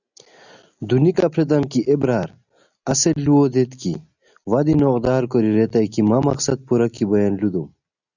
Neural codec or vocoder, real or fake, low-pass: none; real; 7.2 kHz